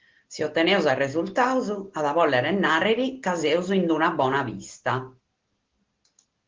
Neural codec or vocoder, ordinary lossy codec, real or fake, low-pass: none; Opus, 16 kbps; real; 7.2 kHz